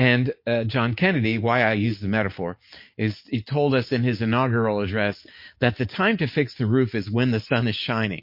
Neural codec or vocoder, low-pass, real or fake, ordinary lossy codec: none; 5.4 kHz; real; MP3, 32 kbps